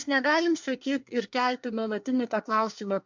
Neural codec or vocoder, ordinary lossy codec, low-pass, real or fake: codec, 24 kHz, 1 kbps, SNAC; MP3, 64 kbps; 7.2 kHz; fake